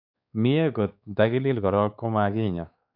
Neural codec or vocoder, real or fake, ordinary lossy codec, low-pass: codec, 16 kHz, 4 kbps, X-Codec, WavLM features, trained on Multilingual LibriSpeech; fake; none; 5.4 kHz